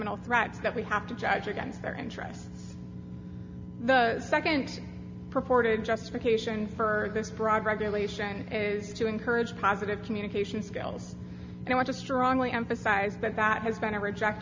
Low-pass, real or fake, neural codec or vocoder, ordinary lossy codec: 7.2 kHz; real; none; MP3, 64 kbps